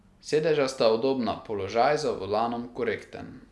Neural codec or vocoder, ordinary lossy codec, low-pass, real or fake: none; none; none; real